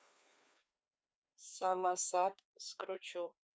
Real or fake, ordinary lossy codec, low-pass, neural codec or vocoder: fake; none; none; codec, 16 kHz, 2 kbps, FreqCodec, larger model